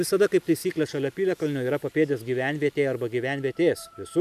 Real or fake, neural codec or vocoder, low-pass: real; none; 14.4 kHz